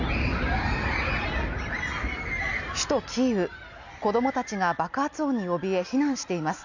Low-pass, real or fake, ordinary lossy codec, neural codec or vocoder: 7.2 kHz; real; none; none